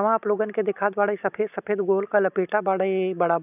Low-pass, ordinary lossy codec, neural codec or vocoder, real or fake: 3.6 kHz; none; none; real